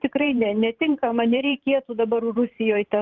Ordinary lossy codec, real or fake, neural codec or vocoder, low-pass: Opus, 32 kbps; fake; vocoder, 44.1 kHz, 128 mel bands every 512 samples, BigVGAN v2; 7.2 kHz